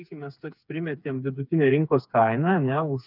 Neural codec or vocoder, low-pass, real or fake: codec, 16 kHz, 4 kbps, FreqCodec, smaller model; 5.4 kHz; fake